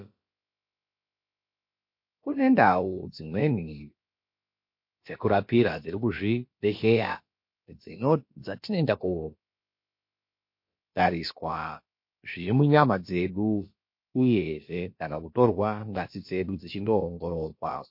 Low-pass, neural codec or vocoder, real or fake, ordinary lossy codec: 5.4 kHz; codec, 16 kHz, about 1 kbps, DyCAST, with the encoder's durations; fake; MP3, 32 kbps